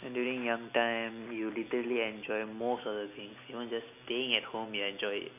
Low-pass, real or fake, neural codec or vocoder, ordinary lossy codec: 3.6 kHz; real; none; none